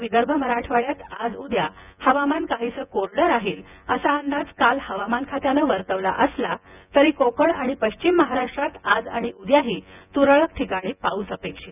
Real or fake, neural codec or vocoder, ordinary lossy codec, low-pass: fake; vocoder, 24 kHz, 100 mel bands, Vocos; none; 3.6 kHz